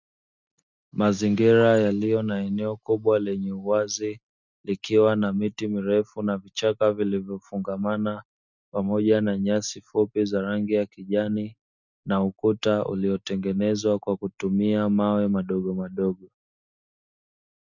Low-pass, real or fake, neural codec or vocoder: 7.2 kHz; real; none